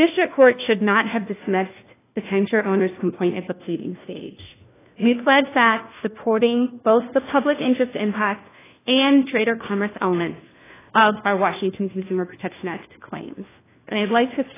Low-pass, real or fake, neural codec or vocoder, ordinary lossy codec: 3.6 kHz; fake; codec, 16 kHz, 1 kbps, FunCodec, trained on LibriTTS, 50 frames a second; AAC, 16 kbps